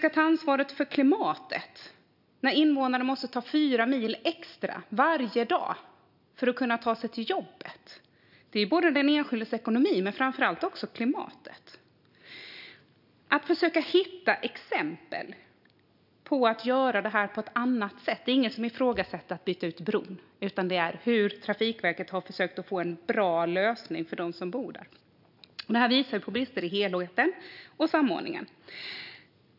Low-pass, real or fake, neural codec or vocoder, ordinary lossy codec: 5.4 kHz; real; none; none